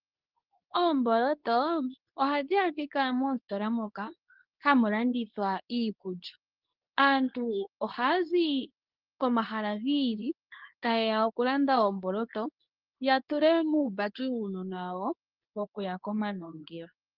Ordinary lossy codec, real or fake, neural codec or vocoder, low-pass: Opus, 32 kbps; fake; codec, 24 kHz, 0.9 kbps, WavTokenizer, medium speech release version 2; 5.4 kHz